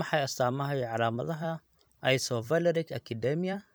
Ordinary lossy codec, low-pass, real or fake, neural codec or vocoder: none; none; fake; vocoder, 44.1 kHz, 128 mel bands every 256 samples, BigVGAN v2